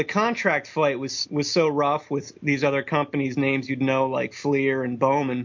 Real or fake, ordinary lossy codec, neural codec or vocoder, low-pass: real; MP3, 48 kbps; none; 7.2 kHz